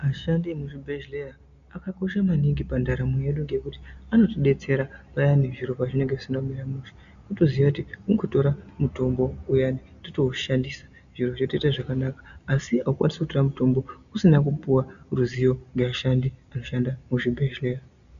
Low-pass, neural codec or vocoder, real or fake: 7.2 kHz; none; real